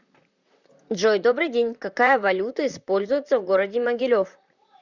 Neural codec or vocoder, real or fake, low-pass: vocoder, 44.1 kHz, 128 mel bands, Pupu-Vocoder; fake; 7.2 kHz